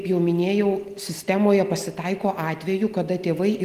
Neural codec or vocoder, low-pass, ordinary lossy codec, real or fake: none; 14.4 kHz; Opus, 24 kbps; real